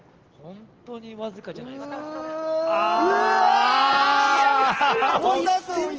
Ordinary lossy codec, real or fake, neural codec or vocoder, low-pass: Opus, 16 kbps; real; none; 7.2 kHz